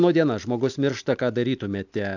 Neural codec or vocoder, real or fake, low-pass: none; real; 7.2 kHz